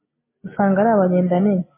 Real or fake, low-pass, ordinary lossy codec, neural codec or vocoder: real; 3.6 kHz; MP3, 16 kbps; none